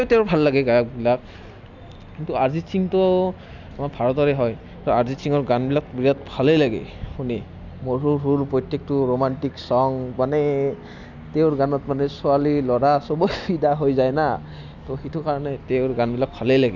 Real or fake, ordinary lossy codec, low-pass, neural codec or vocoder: real; none; 7.2 kHz; none